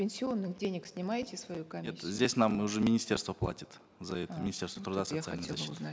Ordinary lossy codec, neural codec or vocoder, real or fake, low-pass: none; none; real; none